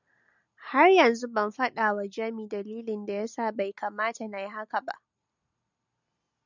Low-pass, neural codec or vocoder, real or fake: 7.2 kHz; none; real